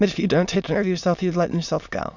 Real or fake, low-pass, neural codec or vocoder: fake; 7.2 kHz; autoencoder, 22.05 kHz, a latent of 192 numbers a frame, VITS, trained on many speakers